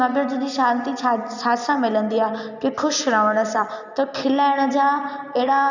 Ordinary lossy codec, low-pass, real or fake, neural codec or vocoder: none; 7.2 kHz; real; none